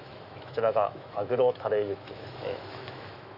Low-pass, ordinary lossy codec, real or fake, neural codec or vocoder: 5.4 kHz; none; fake; autoencoder, 48 kHz, 128 numbers a frame, DAC-VAE, trained on Japanese speech